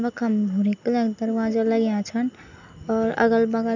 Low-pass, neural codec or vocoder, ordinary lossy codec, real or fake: 7.2 kHz; none; none; real